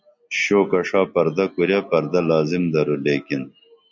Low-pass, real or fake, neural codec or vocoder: 7.2 kHz; real; none